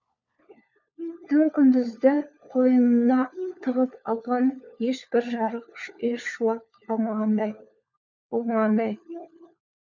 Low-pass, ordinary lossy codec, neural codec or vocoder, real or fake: 7.2 kHz; none; codec, 16 kHz, 4 kbps, FunCodec, trained on LibriTTS, 50 frames a second; fake